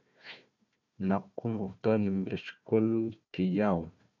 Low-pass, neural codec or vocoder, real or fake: 7.2 kHz; codec, 16 kHz, 1 kbps, FunCodec, trained on Chinese and English, 50 frames a second; fake